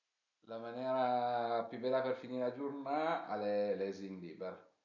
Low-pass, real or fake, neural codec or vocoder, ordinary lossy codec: 7.2 kHz; real; none; none